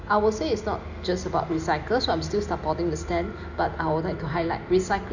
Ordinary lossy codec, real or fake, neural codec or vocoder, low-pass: none; real; none; 7.2 kHz